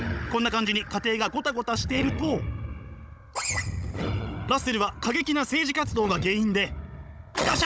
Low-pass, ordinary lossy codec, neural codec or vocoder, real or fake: none; none; codec, 16 kHz, 16 kbps, FunCodec, trained on Chinese and English, 50 frames a second; fake